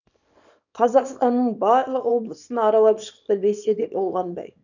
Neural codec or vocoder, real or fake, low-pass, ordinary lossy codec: codec, 24 kHz, 0.9 kbps, WavTokenizer, small release; fake; 7.2 kHz; none